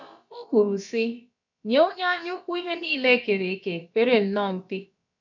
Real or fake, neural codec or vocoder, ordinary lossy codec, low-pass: fake; codec, 16 kHz, about 1 kbps, DyCAST, with the encoder's durations; none; 7.2 kHz